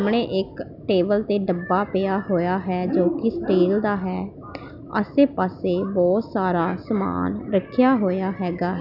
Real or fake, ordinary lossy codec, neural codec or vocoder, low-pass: real; none; none; 5.4 kHz